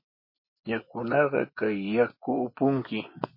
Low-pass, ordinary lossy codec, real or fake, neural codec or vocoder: 5.4 kHz; MP3, 24 kbps; fake; vocoder, 24 kHz, 100 mel bands, Vocos